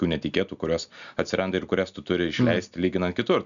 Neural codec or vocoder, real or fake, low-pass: none; real; 7.2 kHz